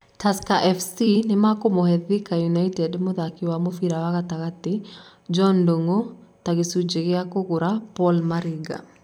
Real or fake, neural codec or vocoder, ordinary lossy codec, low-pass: fake; vocoder, 44.1 kHz, 128 mel bands every 256 samples, BigVGAN v2; none; 19.8 kHz